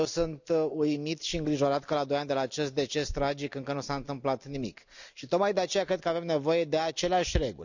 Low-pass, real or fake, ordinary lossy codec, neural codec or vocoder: 7.2 kHz; real; none; none